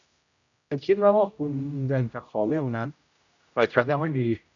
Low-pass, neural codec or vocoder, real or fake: 7.2 kHz; codec, 16 kHz, 0.5 kbps, X-Codec, HuBERT features, trained on general audio; fake